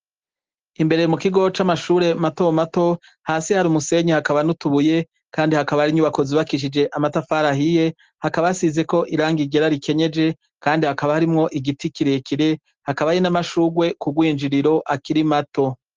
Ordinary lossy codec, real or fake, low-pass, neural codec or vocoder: Opus, 16 kbps; real; 7.2 kHz; none